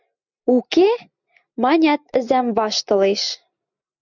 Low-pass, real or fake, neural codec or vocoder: 7.2 kHz; real; none